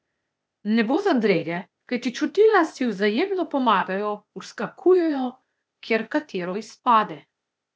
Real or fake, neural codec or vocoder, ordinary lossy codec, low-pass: fake; codec, 16 kHz, 0.8 kbps, ZipCodec; none; none